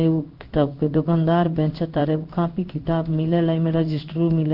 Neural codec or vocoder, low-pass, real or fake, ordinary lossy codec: codec, 16 kHz in and 24 kHz out, 1 kbps, XY-Tokenizer; 5.4 kHz; fake; Opus, 32 kbps